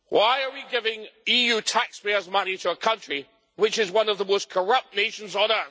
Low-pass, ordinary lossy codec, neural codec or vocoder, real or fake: none; none; none; real